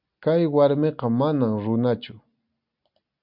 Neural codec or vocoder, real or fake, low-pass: none; real; 5.4 kHz